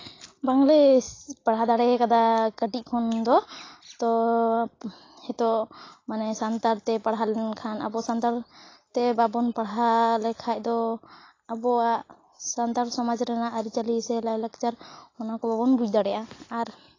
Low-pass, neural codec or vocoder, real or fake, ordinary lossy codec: 7.2 kHz; none; real; AAC, 32 kbps